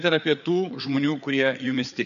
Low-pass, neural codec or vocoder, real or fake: 7.2 kHz; codec, 16 kHz, 4 kbps, FreqCodec, larger model; fake